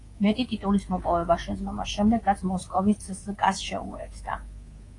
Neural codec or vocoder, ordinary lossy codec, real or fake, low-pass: codec, 24 kHz, 3.1 kbps, DualCodec; AAC, 32 kbps; fake; 10.8 kHz